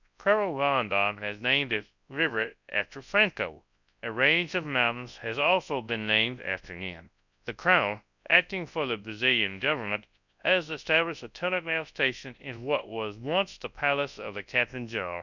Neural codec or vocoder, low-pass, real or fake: codec, 24 kHz, 0.9 kbps, WavTokenizer, large speech release; 7.2 kHz; fake